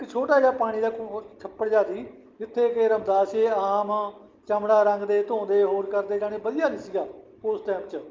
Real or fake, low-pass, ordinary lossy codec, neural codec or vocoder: real; 7.2 kHz; Opus, 32 kbps; none